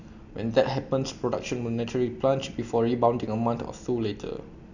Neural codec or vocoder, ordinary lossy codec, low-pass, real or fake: none; none; 7.2 kHz; real